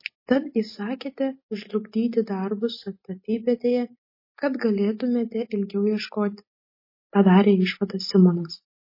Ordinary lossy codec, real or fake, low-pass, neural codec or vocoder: MP3, 24 kbps; real; 5.4 kHz; none